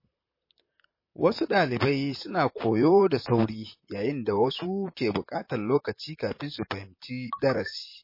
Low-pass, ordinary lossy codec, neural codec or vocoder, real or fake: 5.4 kHz; MP3, 24 kbps; vocoder, 44.1 kHz, 128 mel bands, Pupu-Vocoder; fake